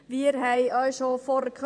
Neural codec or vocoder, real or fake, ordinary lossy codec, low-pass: vocoder, 24 kHz, 100 mel bands, Vocos; fake; none; 9.9 kHz